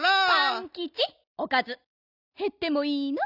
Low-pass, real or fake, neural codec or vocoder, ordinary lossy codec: 5.4 kHz; real; none; none